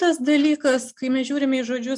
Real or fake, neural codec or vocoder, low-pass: real; none; 10.8 kHz